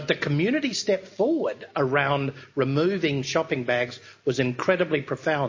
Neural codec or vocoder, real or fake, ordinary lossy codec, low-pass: none; real; MP3, 32 kbps; 7.2 kHz